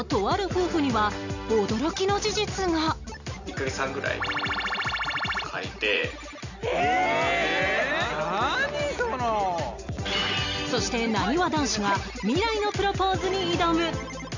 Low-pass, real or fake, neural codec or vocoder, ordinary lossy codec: 7.2 kHz; real; none; none